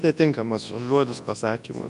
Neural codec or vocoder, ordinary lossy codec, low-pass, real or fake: codec, 24 kHz, 0.9 kbps, WavTokenizer, large speech release; MP3, 64 kbps; 10.8 kHz; fake